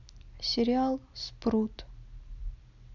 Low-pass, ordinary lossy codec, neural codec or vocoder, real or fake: 7.2 kHz; none; none; real